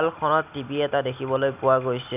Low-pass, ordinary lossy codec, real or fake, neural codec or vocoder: 3.6 kHz; none; real; none